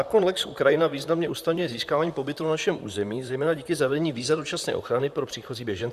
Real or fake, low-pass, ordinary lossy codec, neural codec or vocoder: fake; 14.4 kHz; Opus, 64 kbps; vocoder, 44.1 kHz, 128 mel bands, Pupu-Vocoder